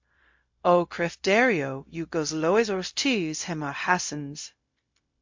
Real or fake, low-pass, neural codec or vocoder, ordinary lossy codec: fake; 7.2 kHz; codec, 16 kHz, 0.4 kbps, LongCat-Audio-Codec; MP3, 48 kbps